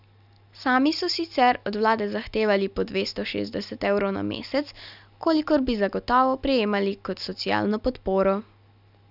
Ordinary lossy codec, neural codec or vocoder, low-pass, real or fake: none; none; 5.4 kHz; real